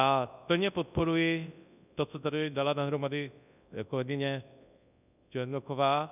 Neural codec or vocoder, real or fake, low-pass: codec, 24 kHz, 0.9 kbps, WavTokenizer, large speech release; fake; 3.6 kHz